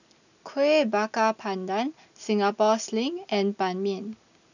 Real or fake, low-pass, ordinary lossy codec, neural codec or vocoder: real; 7.2 kHz; none; none